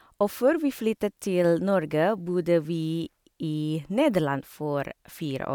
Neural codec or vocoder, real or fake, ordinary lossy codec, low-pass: none; real; none; 19.8 kHz